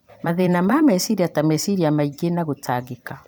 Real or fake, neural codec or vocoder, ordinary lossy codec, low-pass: fake; vocoder, 44.1 kHz, 128 mel bands every 256 samples, BigVGAN v2; none; none